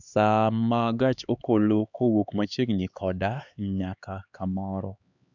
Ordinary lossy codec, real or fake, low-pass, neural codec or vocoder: none; fake; 7.2 kHz; codec, 16 kHz, 2 kbps, X-Codec, HuBERT features, trained on LibriSpeech